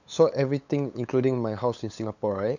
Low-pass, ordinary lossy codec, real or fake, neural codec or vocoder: 7.2 kHz; none; fake; codec, 16 kHz, 8 kbps, FunCodec, trained on LibriTTS, 25 frames a second